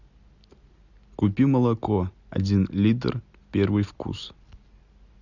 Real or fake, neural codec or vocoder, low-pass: real; none; 7.2 kHz